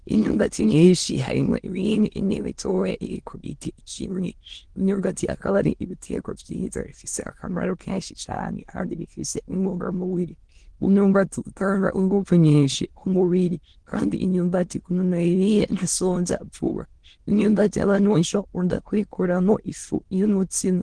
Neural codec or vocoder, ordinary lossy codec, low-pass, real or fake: autoencoder, 22.05 kHz, a latent of 192 numbers a frame, VITS, trained on many speakers; Opus, 16 kbps; 9.9 kHz; fake